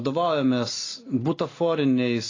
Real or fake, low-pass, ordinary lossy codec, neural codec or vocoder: real; 7.2 kHz; AAC, 32 kbps; none